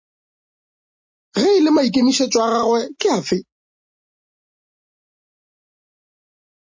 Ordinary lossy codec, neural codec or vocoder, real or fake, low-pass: MP3, 32 kbps; none; real; 7.2 kHz